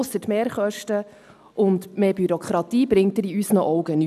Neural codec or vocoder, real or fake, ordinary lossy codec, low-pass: none; real; none; 14.4 kHz